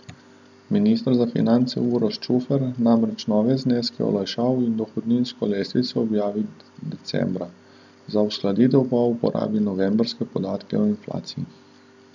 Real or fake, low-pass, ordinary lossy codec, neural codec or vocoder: real; 7.2 kHz; none; none